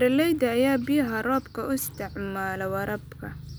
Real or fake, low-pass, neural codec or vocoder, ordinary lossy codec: real; none; none; none